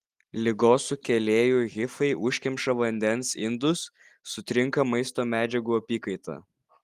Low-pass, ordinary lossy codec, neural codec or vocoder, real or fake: 14.4 kHz; Opus, 24 kbps; none; real